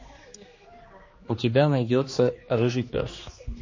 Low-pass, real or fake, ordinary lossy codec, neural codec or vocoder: 7.2 kHz; fake; MP3, 32 kbps; codec, 16 kHz, 2 kbps, X-Codec, HuBERT features, trained on general audio